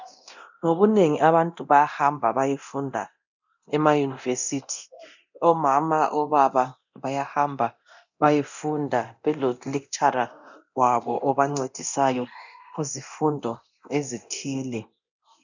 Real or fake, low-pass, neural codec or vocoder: fake; 7.2 kHz; codec, 24 kHz, 0.9 kbps, DualCodec